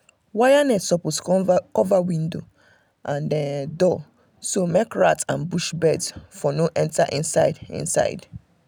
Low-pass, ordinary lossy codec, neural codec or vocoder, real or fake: none; none; none; real